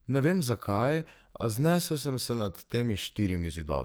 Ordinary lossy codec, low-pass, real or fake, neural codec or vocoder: none; none; fake; codec, 44.1 kHz, 2.6 kbps, SNAC